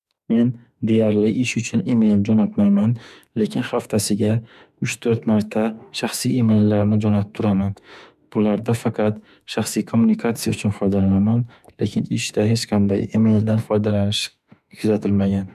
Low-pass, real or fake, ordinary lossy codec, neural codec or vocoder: 14.4 kHz; fake; none; autoencoder, 48 kHz, 32 numbers a frame, DAC-VAE, trained on Japanese speech